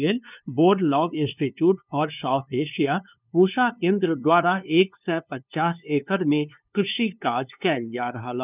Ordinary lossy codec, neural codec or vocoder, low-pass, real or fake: none; codec, 16 kHz, 2 kbps, FunCodec, trained on LibriTTS, 25 frames a second; 3.6 kHz; fake